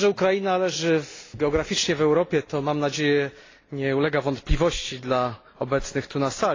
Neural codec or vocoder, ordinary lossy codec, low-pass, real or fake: none; AAC, 32 kbps; 7.2 kHz; real